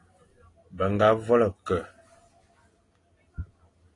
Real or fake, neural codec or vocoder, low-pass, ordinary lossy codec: real; none; 10.8 kHz; AAC, 32 kbps